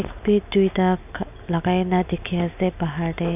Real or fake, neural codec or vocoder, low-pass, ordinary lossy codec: real; none; 3.6 kHz; none